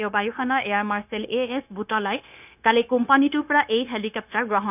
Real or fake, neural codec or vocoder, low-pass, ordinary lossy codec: fake; codec, 16 kHz, 0.9 kbps, LongCat-Audio-Codec; 3.6 kHz; none